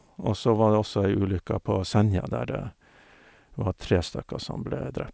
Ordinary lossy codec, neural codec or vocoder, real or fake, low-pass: none; none; real; none